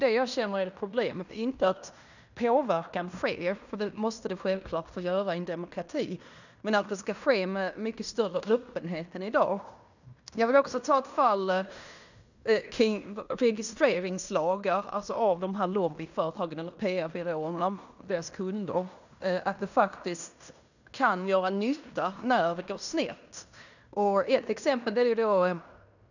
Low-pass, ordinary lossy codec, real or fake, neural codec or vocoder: 7.2 kHz; none; fake; codec, 16 kHz in and 24 kHz out, 0.9 kbps, LongCat-Audio-Codec, fine tuned four codebook decoder